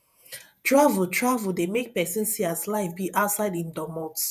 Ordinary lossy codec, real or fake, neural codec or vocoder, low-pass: none; fake; vocoder, 48 kHz, 128 mel bands, Vocos; 14.4 kHz